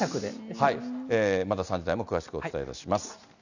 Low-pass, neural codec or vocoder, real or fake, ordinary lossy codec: 7.2 kHz; none; real; none